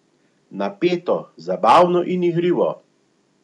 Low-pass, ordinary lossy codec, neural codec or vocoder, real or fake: 10.8 kHz; none; none; real